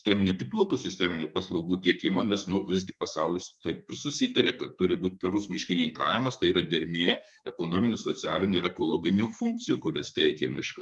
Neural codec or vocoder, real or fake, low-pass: codec, 32 kHz, 1.9 kbps, SNAC; fake; 10.8 kHz